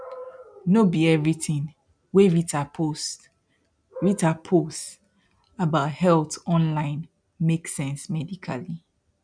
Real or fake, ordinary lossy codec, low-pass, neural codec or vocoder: real; none; 9.9 kHz; none